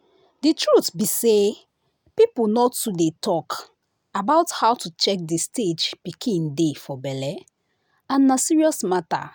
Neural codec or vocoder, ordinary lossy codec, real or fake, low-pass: none; none; real; none